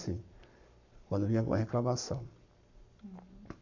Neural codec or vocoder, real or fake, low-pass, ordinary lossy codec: codec, 44.1 kHz, 7.8 kbps, Pupu-Codec; fake; 7.2 kHz; none